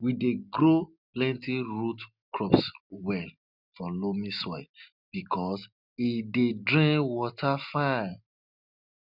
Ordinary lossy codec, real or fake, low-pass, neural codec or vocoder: none; real; 5.4 kHz; none